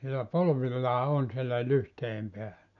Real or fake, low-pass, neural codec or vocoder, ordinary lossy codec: real; 7.2 kHz; none; AAC, 64 kbps